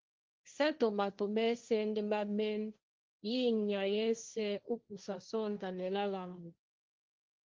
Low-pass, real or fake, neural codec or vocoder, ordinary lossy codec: 7.2 kHz; fake; codec, 16 kHz, 1.1 kbps, Voila-Tokenizer; Opus, 32 kbps